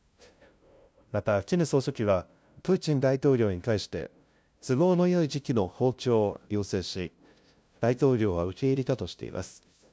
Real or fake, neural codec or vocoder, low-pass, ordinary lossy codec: fake; codec, 16 kHz, 0.5 kbps, FunCodec, trained on LibriTTS, 25 frames a second; none; none